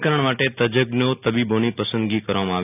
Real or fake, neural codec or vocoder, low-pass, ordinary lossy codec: real; none; 3.6 kHz; none